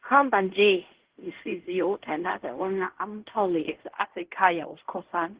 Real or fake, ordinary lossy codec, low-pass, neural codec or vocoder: fake; Opus, 16 kbps; 3.6 kHz; codec, 16 kHz in and 24 kHz out, 0.4 kbps, LongCat-Audio-Codec, fine tuned four codebook decoder